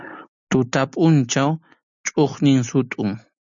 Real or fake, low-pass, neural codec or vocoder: real; 7.2 kHz; none